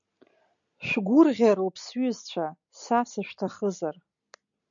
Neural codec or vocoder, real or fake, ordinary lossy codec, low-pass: none; real; MP3, 96 kbps; 7.2 kHz